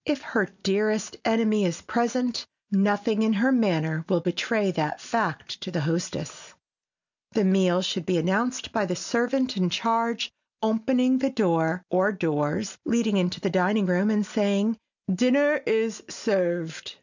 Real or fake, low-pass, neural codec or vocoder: real; 7.2 kHz; none